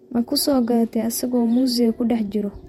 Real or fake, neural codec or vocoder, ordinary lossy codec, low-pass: fake; vocoder, 48 kHz, 128 mel bands, Vocos; MP3, 64 kbps; 19.8 kHz